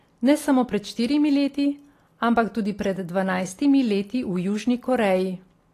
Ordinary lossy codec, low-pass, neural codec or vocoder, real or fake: AAC, 48 kbps; 14.4 kHz; none; real